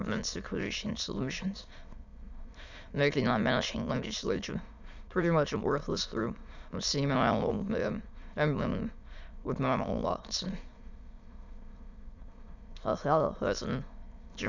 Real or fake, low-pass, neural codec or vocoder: fake; 7.2 kHz; autoencoder, 22.05 kHz, a latent of 192 numbers a frame, VITS, trained on many speakers